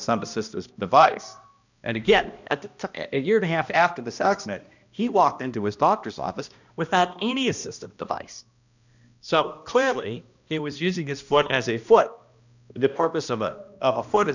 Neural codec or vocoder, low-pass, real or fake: codec, 16 kHz, 1 kbps, X-Codec, HuBERT features, trained on balanced general audio; 7.2 kHz; fake